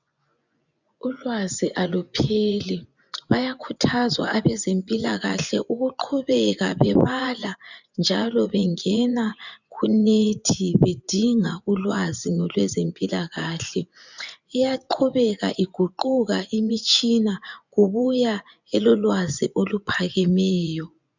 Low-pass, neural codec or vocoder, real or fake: 7.2 kHz; vocoder, 24 kHz, 100 mel bands, Vocos; fake